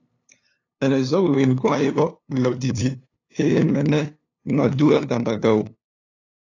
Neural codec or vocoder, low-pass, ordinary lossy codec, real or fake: codec, 16 kHz, 2 kbps, FunCodec, trained on LibriTTS, 25 frames a second; 7.2 kHz; AAC, 32 kbps; fake